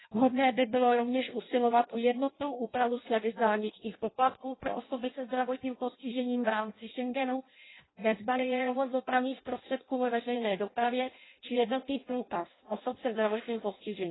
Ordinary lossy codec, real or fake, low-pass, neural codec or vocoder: AAC, 16 kbps; fake; 7.2 kHz; codec, 16 kHz in and 24 kHz out, 0.6 kbps, FireRedTTS-2 codec